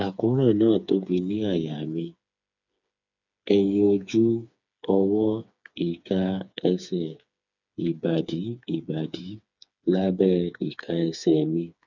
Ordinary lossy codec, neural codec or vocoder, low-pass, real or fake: none; codec, 16 kHz, 4 kbps, FreqCodec, smaller model; 7.2 kHz; fake